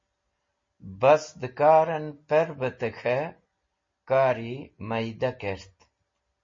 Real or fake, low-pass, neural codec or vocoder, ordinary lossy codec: real; 7.2 kHz; none; MP3, 32 kbps